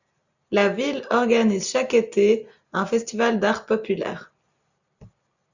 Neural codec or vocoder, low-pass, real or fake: none; 7.2 kHz; real